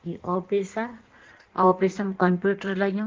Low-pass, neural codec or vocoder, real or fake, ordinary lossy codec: 7.2 kHz; codec, 16 kHz in and 24 kHz out, 1.1 kbps, FireRedTTS-2 codec; fake; Opus, 16 kbps